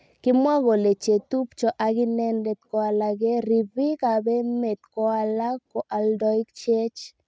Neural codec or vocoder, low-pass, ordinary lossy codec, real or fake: none; none; none; real